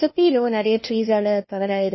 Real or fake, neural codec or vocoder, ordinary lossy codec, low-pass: fake; codec, 16 kHz, 1 kbps, FunCodec, trained on LibriTTS, 50 frames a second; MP3, 24 kbps; 7.2 kHz